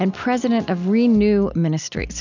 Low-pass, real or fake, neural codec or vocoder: 7.2 kHz; real; none